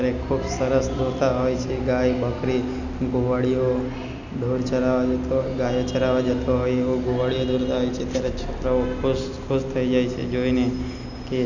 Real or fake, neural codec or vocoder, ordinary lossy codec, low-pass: real; none; none; 7.2 kHz